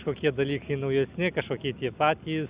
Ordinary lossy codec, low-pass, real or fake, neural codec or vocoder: Opus, 64 kbps; 3.6 kHz; real; none